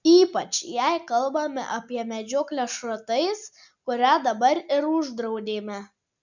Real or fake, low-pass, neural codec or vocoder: real; 7.2 kHz; none